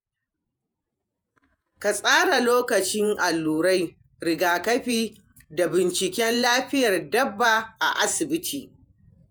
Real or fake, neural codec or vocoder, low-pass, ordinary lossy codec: fake; vocoder, 48 kHz, 128 mel bands, Vocos; none; none